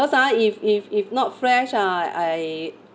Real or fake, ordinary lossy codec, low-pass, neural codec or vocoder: real; none; none; none